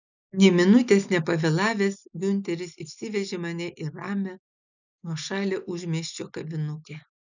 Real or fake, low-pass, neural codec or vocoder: real; 7.2 kHz; none